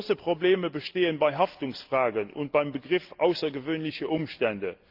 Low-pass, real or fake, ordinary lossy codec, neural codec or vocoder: 5.4 kHz; real; Opus, 24 kbps; none